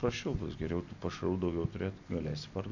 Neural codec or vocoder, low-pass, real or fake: none; 7.2 kHz; real